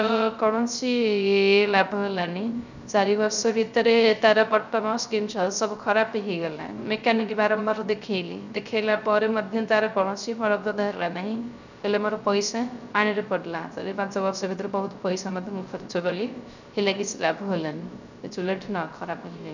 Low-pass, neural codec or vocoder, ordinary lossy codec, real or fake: 7.2 kHz; codec, 16 kHz, 0.3 kbps, FocalCodec; none; fake